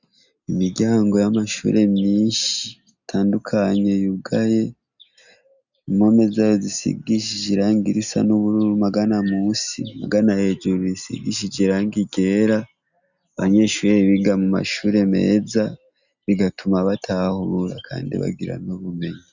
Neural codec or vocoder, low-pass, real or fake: none; 7.2 kHz; real